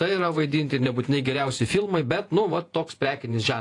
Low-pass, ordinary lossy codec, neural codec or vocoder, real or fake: 10.8 kHz; AAC, 48 kbps; vocoder, 48 kHz, 128 mel bands, Vocos; fake